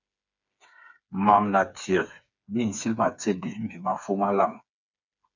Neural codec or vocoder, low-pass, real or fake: codec, 16 kHz, 4 kbps, FreqCodec, smaller model; 7.2 kHz; fake